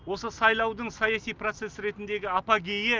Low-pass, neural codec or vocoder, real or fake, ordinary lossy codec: 7.2 kHz; none; real; Opus, 24 kbps